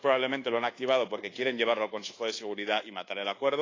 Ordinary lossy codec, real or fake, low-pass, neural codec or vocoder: AAC, 32 kbps; fake; 7.2 kHz; codec, 24 kHz, 1.2 kbps, DualCodec